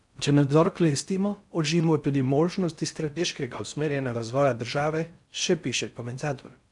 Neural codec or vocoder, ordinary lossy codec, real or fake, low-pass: codec, 16 kHz in and 24 kHz out, 0.6 kbps, FocalCodec, streaming, 4096 codes; none; fake; 10.8 kHz